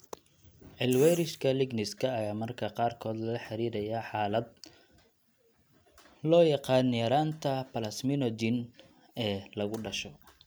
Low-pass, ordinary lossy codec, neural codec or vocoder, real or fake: none; none; none; real